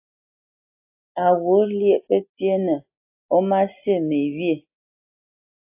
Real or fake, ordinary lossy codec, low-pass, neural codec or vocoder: real; AAC, 32 kbps; 3.6 kHz; none